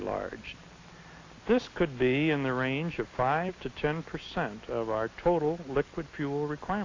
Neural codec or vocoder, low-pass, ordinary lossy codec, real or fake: none; 7.2 kHz; AAC, 32 kbps; real